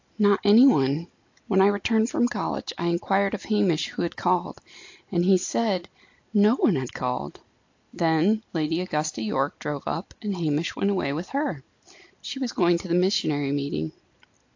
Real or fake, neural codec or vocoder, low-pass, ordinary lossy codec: real; none; 7.2 kHz; AAC, 48 kbps